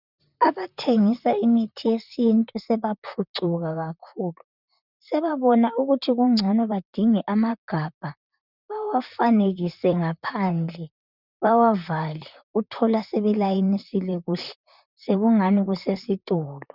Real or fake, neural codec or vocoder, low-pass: fake; vocoder, 44.1 kHz, 128 mel bands, Pupu-Vocoder; 5.4 kHz